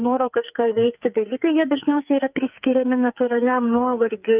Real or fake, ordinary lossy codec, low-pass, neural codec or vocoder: fake; Opus, 24 kbps; 3.6 kHz; codec, 44.1 kHz, 2.6 kbps, SNAC